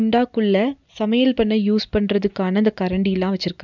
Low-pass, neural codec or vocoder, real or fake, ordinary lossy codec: 7.2 kHz; none; real; none